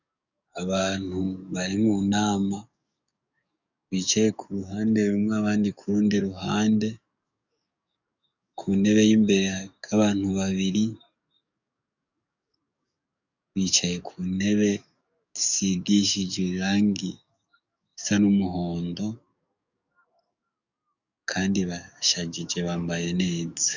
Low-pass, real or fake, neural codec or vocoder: 7.2 kHz; fake; codec, 44.1 kHz, 7.8 kbps, DAC